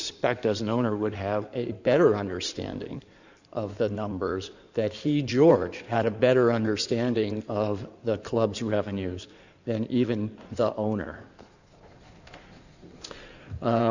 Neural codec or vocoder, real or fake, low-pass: codec, 16 kHz in and 24 kHz out, 2.2 kbps, FireRedTTS-2 codec; fake; 7.2 kHz